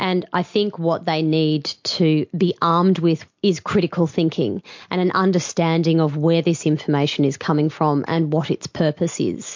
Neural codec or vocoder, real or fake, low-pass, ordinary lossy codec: none; real; 7.2 kHz; MP3, 48 kbps